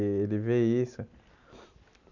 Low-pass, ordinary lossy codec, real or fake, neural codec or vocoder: 7.2 kHz; none; real; none